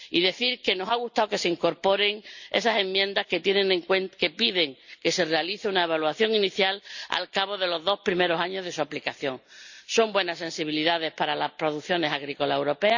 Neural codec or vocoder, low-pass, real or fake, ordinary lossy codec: none; 7.2 kHz; real; none